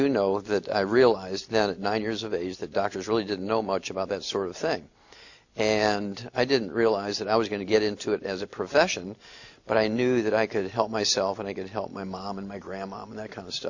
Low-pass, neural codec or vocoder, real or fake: 7.2 kHz; none; real